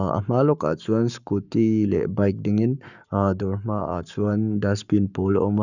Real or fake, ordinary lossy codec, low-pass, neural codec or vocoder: fake; none; 7.2 kHz; codec, 44.1 kHz, 7.8 kbps, Pupu-Codec